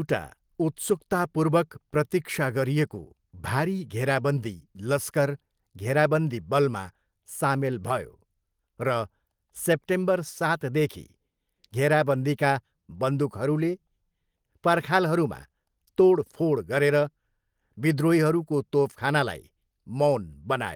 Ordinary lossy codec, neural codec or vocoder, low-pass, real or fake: Opus, 24 kbps; none; 19.8 kHz; real